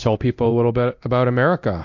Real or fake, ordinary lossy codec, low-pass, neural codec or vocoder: fake; MP3, 48 kbps; 7.2 kHz; codec, 24 kHz, 0.9 kbps, DualCodec